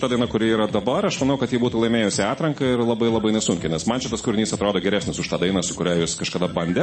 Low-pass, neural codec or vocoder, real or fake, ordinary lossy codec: 9.9 kHz; vocoder, 44.1 kHz, 128 mel bands every 256 samples, BigVGAN v2; fake; MP3, 32 kbps